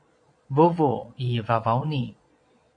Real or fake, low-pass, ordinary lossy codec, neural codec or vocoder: fake; 9.9 kHz; AAC, 64 kbps; vocoder, 22.05 kHz, 80 mel bands, Vocos